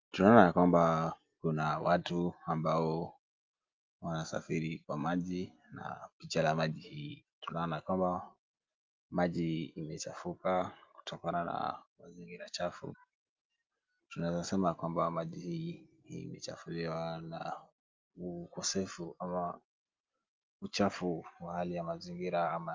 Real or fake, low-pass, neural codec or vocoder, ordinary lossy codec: real; 7.2 kHz; none; Opus, 32 kbps